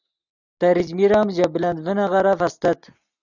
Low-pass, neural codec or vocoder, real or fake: 7.2 kHz; none; real